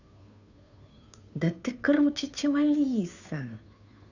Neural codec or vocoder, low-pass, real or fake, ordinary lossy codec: codec, 16 kHz, 2 kbps, FunCodec, trained on Chinese and English, 25 frames a second; 7.2 kHz; fake; none